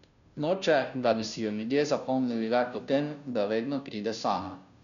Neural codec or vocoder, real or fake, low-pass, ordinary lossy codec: codec, 16 kHz, 0.5 kbps, FunCodec, trained on Chinese and English, 25 frames a second; fake; 7.2 kHz; none